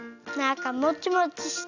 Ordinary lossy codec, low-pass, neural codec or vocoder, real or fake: none; 7.2 kHz; none; real